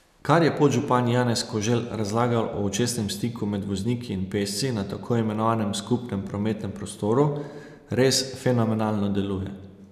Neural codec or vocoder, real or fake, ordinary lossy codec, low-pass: none; real; none; 14.4 kHz